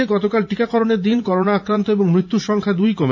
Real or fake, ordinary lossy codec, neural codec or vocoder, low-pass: fake; none; vocoder, 44.1 kHz, 80 mel bands, Vocos; 7.2 kHz